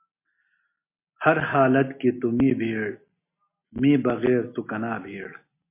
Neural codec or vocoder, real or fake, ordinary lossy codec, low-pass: none; real; MP3, 24 kbps; 3.6 kHz